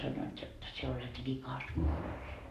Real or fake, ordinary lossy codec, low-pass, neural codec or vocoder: fake; none; 14.4 kHz; codec, 44.1 kHz, 7.8 kbps, Pupu-Codec